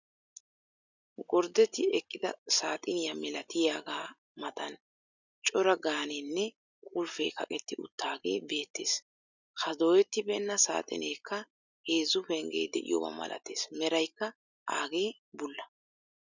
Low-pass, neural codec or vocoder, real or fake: 7.2 kHz; none; real